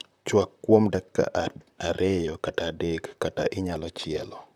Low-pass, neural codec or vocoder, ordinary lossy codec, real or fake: 19.8 kHz; vocoder, 44.1 kHz, 128 mel bands, Pupu-Vocoder; none; fake